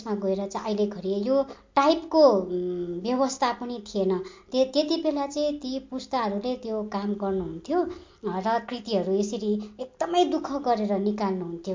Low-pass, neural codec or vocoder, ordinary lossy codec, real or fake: 7.2 kHz; none; MP3, 48 kbps; real